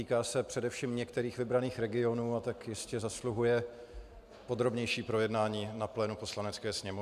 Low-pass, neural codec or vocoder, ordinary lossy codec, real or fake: 14.4 kHz; none; MP3, 96 kbps; real